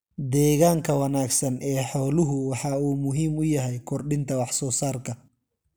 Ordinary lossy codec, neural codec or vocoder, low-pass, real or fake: none; none; none; real